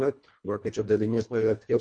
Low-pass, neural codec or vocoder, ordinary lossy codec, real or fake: 9.9 kHz; codec, 24 kHz, 1.5 kbps, HILCodec; MP3, 48 kbps; fake